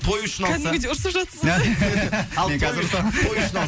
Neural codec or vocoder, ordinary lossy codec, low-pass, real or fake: none; none; none; real